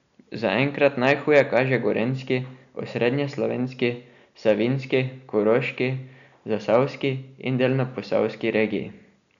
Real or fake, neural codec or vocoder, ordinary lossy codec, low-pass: real; none; none; 7.2 kHz